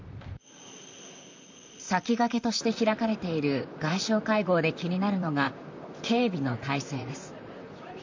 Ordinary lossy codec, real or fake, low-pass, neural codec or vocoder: MP3, 64 kbps; fake; 7.2 kHz; vocoder, 44.1 kHz, 128 mel bands, Pupu-Vocoder